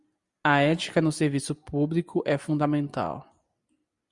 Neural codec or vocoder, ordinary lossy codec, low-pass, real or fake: none; Opus, 64 kbps; 10.8 kHz; real